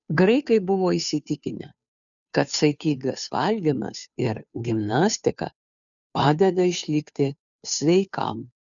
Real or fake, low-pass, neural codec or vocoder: fake; 7.2 kHz; codec, 16 kHz, 2 kbps, FunCodec, trained on Chinese and English, 25 frames a second